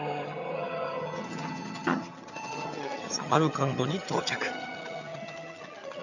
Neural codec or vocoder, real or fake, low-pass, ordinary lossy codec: vocoder, 22.05 kHz, 80 mel bands, HiFi-GAN; fake; 7.2 kHz; none